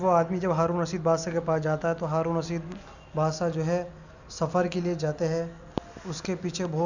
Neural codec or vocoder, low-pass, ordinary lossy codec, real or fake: none; 7.2 kHz; none; real